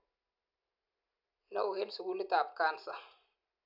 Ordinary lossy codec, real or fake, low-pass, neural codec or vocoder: none; real; 5.4 kHz; none